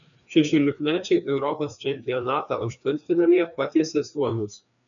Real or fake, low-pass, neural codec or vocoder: fake; 7.2 kHz; codec, 16 kHz, 2 kbps, FreqCodec, larger model